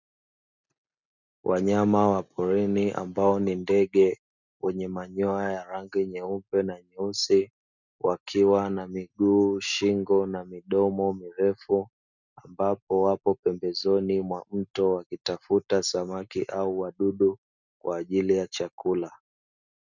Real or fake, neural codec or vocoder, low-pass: real; none; 7.2 kHz